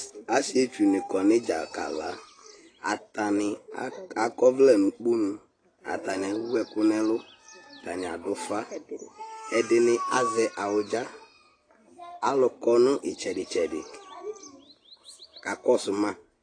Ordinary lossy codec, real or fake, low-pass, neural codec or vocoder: AAC, 32 kbps; real; 9.9 kHz; none